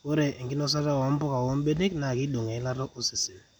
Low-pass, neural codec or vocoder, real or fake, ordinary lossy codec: none; none; real; none